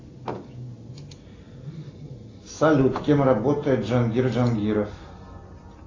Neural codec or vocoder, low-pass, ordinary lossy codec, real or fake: none; 7.2 kHz; AAC, 32 kbps; real